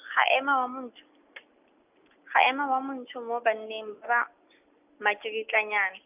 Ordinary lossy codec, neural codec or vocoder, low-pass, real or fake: none; none; 3.6 kHz; real